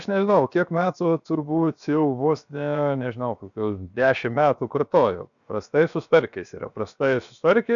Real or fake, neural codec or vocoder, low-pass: fake; codec, 16 kHz, 0.7 kbps, FocalCodec; 7.2 kHz